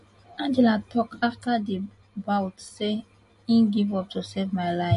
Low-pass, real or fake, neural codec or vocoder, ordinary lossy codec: 14.4 kHz; real; none; MP3, 48 kbps